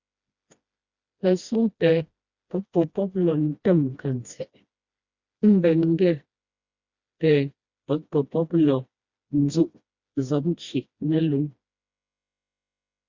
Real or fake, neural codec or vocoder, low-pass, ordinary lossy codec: fake; codec, 16 kHz, 1 kbps, FreqCodec, smaller model; 7.2 kHz; Opus, 64 kbps